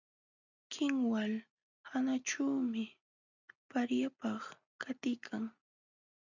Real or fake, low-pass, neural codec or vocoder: real; 7.2 kHz; none